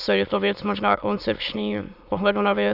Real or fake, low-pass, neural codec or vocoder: fake; 5.4 kHz; autoencoder, 22.05 kHz, a latent of 192 numbers a frame, VITS, trained on many speakers